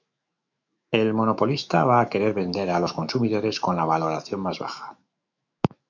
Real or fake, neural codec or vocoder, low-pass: fake; autoencoder, 48 kHz, 128 numbers a frame, DAC-VAE, trained on Japanese speech; 7.2 kHz